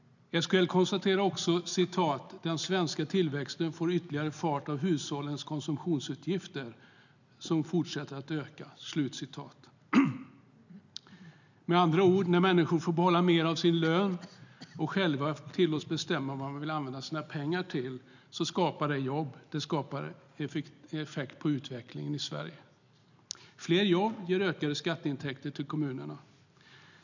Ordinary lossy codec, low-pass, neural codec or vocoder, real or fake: none; 7.2 kHz; none; real